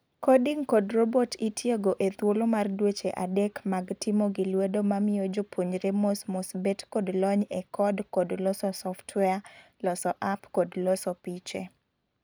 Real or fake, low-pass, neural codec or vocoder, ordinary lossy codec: real; none; none; none